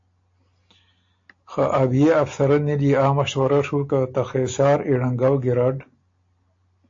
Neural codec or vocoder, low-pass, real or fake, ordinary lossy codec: none; 7.2 kHz; real; AAC, 48 kbps